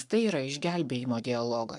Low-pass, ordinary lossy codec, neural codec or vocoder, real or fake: 10.8 kHz; MP3, 96 kbps; codec, 44.1 kHz, 7.8 kbps, Pupu-Codec; fake